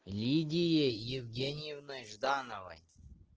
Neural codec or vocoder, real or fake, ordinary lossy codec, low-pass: none; real; Opus, 16 kbps; 7.2 kHz